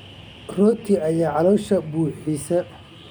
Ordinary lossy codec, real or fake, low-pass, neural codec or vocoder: none; real; none; none